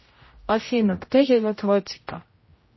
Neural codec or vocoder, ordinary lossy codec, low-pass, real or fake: codec, 16 kHz, 0.5 kbps, X-Codec, HuBERT features, trained on general audio; MP3, 24 kbps; 7.2 kHz; fake